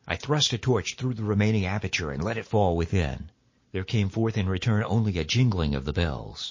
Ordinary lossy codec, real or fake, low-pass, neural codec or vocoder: MP3, 32 kbps; real; 7.2 kHz; none